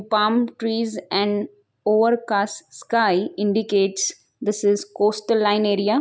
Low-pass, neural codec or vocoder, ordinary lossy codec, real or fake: none; none; none; real